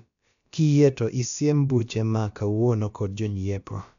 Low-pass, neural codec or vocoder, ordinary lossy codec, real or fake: 7.2 kHz; codec, 16 kHz, about 1 kbps, DyCAST, with the encoder's durations; none; fake